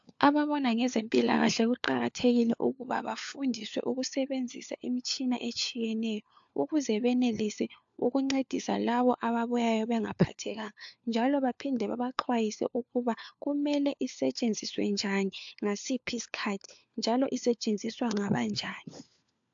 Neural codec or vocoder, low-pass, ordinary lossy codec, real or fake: codec, 16 kHz, 4 kbps, FunCodec, trained on LibriTTS, 50 frames a second; 7.2 kHz; AAC, 64 kbps; fake